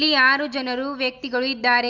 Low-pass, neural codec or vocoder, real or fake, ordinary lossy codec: 7.2 kHz; none; real; none